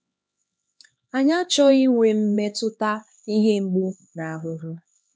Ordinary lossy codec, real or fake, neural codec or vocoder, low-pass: none; fake; codec, 16 kHz, 2 kbps, X-Codec, HuBERT features, trained on LibriSpeech; none